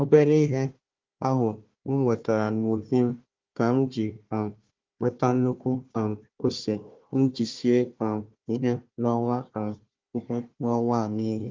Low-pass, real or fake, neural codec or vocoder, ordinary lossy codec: 7.2 kHz; fake; codec, 16 kHz, 1 kbps, FunCodec, trained on Chinese and English, 50 frames a second; Opus, 32 kbps